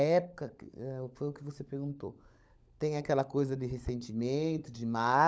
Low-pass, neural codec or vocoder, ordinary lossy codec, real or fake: none; codec, 16 kHz, 16 kbps, FunCodec, trained on LibriTTS, 50 frames a second; none; fake